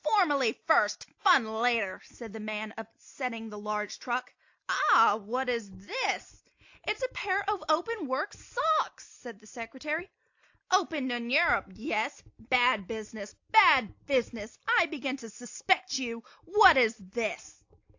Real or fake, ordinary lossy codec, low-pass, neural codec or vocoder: real; AAC, 48 kbps; 7.2 kHz; none